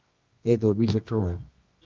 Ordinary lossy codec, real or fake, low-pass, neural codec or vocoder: Opus, 32 kbps; fake; 7.2 kHz; codec, 24 kHz, 0.9 kbps, WavTokenizer, medium music audio release